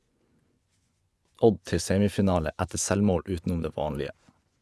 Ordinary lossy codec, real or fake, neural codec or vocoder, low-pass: none; fake; vocoder, 24 kHz, 100 mel bands, Vocos; none